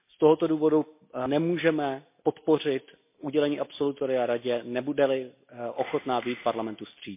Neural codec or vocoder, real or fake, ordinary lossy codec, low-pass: none; real; MP3, 24 kbps; 3.6 kHz